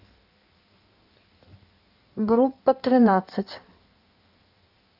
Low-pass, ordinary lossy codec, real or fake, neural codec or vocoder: 5.4 kHz; none; fake; codec, 16 kHz in and 24 kHz out, 1.1 kbps, FireRedTTS-2 codec